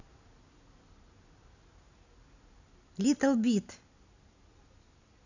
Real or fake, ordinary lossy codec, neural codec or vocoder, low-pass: real; none; none; 7.2 kHz